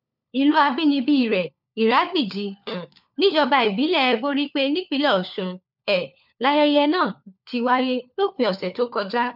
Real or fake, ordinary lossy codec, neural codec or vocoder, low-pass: fake; none; codec, 16 kHz, 4 kbps, FunCodec, trained on LibriTTS, 50 frames a second; 5.4 kHz